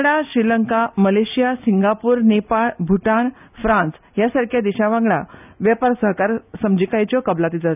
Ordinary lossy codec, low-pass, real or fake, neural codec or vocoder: none; 3.6 kHz; real; none